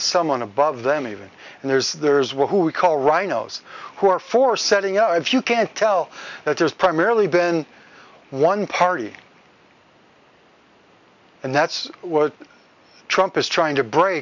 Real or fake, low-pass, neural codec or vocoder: real; 7.2 kHz; none